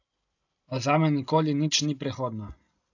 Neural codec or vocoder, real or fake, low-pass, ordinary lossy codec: none; real; 7.2 kHz; AAC, 48 kbps